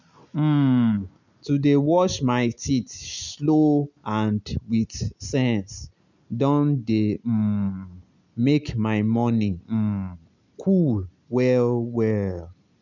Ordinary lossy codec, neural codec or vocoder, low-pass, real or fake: none; none; 7.2 kHz; real